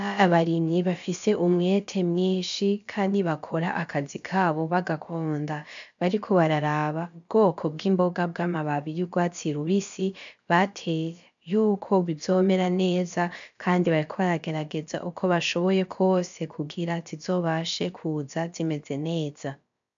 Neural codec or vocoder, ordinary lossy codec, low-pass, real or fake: codec, 16 kHz, about 1 kbps, DyCAST, with the encoder's durations; MP3, 64 kbps; 7.2 kHz; fake